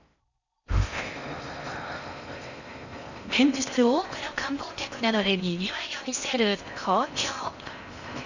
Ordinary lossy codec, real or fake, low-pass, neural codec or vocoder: none; fake; 7.2 kHz; codec, 16 kHz in and 24 kHz out, 0.6 kbps, FocalCodec, streaming, 4096 codes